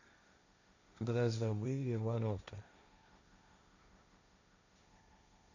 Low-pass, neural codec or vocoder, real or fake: 7.2 kHz; codec, 16 kHz, 1.1 kbps, Voila-Tokenizer; fake